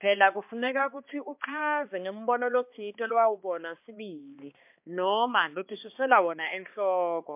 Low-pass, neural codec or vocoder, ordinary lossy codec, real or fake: 3.6 kHz; codec, 16 kHz, 2 kbps, X-Codec, HuBERT features, trained on balanced general audio; MP3, 32 kbps; fake